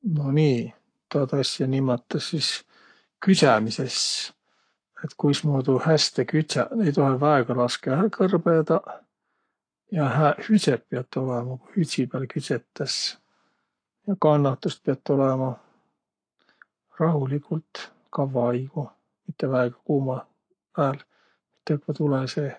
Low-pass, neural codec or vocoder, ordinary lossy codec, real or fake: 9.9 kHz; codec, 44.1 kHz, 7.8 kbps, Pupu-Codec; AAC, 48 kbps; fake